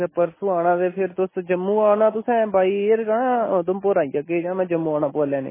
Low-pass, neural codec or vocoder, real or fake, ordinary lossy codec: 3.6 kHz; none; real; MP3, 16 kbps